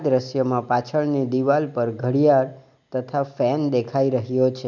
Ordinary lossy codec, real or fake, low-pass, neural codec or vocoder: none; real; 7.2 kHz; none